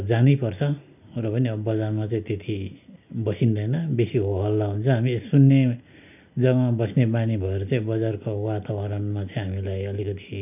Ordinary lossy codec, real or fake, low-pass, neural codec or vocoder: none; real; 3.6 kHz; none